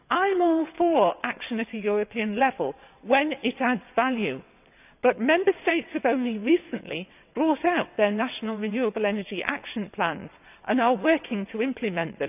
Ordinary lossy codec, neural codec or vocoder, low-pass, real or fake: none; vocoder, 22.05 kHz, 80 mel bands, WaveNeXt; 3.6 kHz; fake